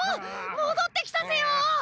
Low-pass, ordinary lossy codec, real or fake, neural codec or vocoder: none; none; real; none